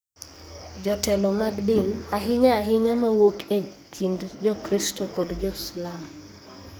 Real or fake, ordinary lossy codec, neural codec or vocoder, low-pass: fake; none; codec, 44.1 kHz, 2.6 kbps, SNAC; none